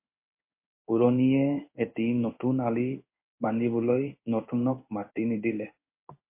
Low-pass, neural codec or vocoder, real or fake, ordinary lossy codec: 3.6 kHz; codec, 16 kHz in and 24 kHz out, 1 kbps, XY-Tokenizer; fake; MP3, 24 kbps